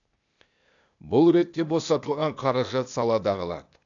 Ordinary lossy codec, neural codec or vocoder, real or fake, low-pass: none; codec, 16 kHz, 0.8 kbps, ZipCodec; fake; 7.2 kHz